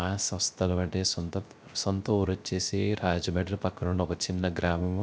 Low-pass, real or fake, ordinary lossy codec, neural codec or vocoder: none; fake; none; codec, 16 kHz, 0.3 kbps, FocalCodec